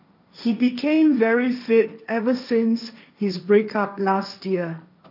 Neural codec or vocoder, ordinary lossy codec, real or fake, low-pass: codec, 16 kHz, 1.1 kbps, Voila-Tokenizer; none; fake; 5.4 kHz